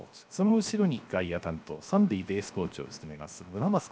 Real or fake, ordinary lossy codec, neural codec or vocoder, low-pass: fake; none; codec, 16 kHz, 0.7 kbps, FocalCodec; none